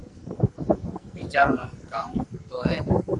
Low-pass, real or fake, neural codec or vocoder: 10.8 kHz; fake; codec, 44.1 kHz, 2.6 kbps, SNAC